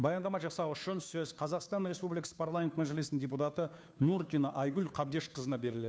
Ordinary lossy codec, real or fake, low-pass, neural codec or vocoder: none; fake; none; codec, 16 kHz, 2 kbps, FunCodec, trained on Chinese and English, 25 frames a second